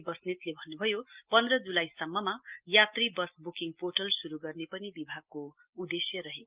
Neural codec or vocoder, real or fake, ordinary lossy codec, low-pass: none; real; Opus, 32 kbps; 3.6 kHz